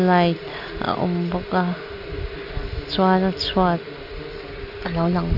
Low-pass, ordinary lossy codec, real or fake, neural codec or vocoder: 5.4 kHz; none; real; none